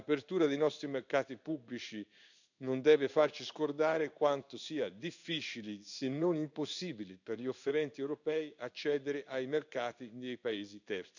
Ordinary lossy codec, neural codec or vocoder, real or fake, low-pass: none; codec, 16 kHz in and 24 kHz out, 1 kbps, XY-Tokenizer; fake; 7.2 kHz